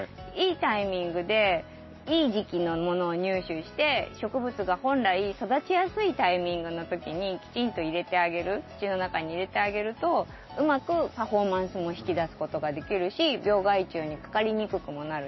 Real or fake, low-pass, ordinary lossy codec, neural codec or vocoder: real; 7.2 kHz; MP3, 24 kbps; none